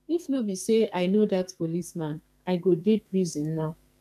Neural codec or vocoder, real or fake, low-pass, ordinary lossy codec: codec, 44.1 kHz, 2.6 kbps, SNAC; fake; 14.4 kHz; none